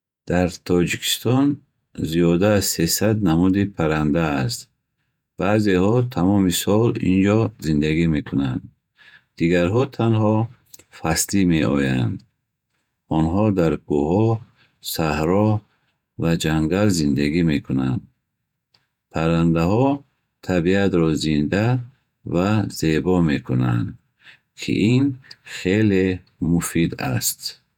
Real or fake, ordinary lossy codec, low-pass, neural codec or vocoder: fake; none; 19.8 kHz; vocoder, 48 kHz, 128 mel bands, Vocos